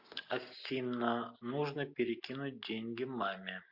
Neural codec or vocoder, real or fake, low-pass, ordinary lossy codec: none; real; 5.4 kHz; MP3, 48 kbps